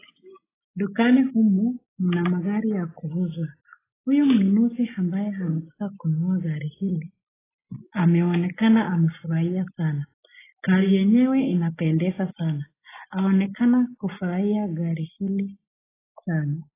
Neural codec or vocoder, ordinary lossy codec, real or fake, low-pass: none; AAC, 16 kbps; real; 3.6 kHz